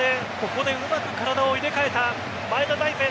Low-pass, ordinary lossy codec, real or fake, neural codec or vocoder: none; none; real; none